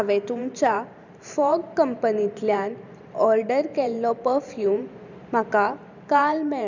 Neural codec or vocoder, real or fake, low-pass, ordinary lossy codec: vocoder, 44.1 kHz, 128 mel bands every 512 samples, BigVGAN v2; fake; 7.2 kHz; none